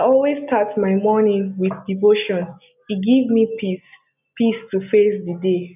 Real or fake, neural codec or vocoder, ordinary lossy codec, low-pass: real; none; none; 3.6 kHz